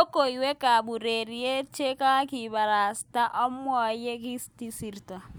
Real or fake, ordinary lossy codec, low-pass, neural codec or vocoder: real; none; none; none